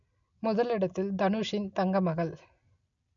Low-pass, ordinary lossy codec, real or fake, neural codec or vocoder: 7.2 kHz; none; real; none